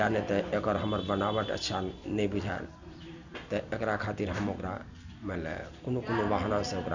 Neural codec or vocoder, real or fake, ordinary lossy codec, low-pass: none; real; none; 7.2 kHz